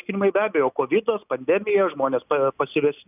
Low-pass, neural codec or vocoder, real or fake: 3.6 kHz; none; real